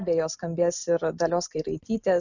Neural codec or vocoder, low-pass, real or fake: none; 7.2 kHz; real